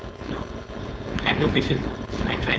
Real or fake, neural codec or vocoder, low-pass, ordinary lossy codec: fake; codec, 16 kHz, 4.8 kbps, FACodec; none; none